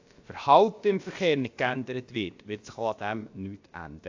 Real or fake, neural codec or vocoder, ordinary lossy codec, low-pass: fake; codec, 16 kHz, about 1 kbps, DyCAST, with the encoder's durations; AAC, 48 kbps; 7.2 kHz